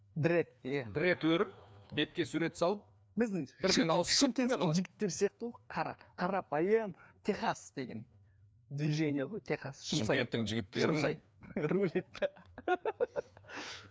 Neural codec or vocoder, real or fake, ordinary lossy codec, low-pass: codec, 16 kHz, 2 kbps, FreqCodec, larger model; fake; none; none